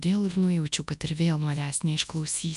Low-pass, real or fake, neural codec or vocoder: 10.8 kHz; fake; codec, 24 kHz, 0.9 kbps, WavTokenizer, large speech release